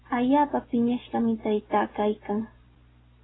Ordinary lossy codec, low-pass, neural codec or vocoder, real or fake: AAC, 16 kbps; 7.2 kHz; none; real